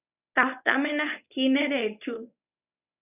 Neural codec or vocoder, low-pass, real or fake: codec, 24 kHz, 0.9 kbps, WavTokenizer, medium speech release version 1; 3.6 kHz; fake